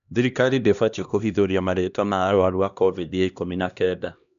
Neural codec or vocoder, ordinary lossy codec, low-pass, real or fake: codec, 16 kHz, 1 kbps, X-Codec, HuBERT features, trained on LibriSpeech; none; 7.2 kHz; fake